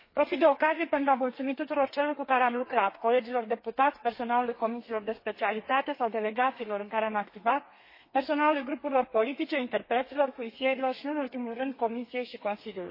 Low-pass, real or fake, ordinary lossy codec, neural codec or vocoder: 5.4 kHz; fake; MP3, 24 kbps; codec, 32 kHz, 1.9 kbps, SNAC